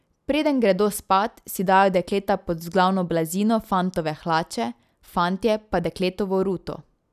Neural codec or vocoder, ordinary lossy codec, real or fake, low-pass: none; none; real; 14.4 kHz